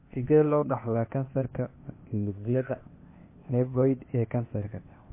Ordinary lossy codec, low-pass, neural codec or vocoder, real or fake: MP3, 24 kbps; 3.6 kHz; codec, 16 kHz, 0.8 kbps, ZipCodec; fake